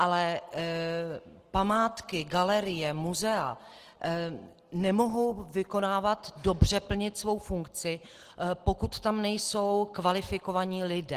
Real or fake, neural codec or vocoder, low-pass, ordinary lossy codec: real; none; 14.4 kHz; Opus, 16 kbps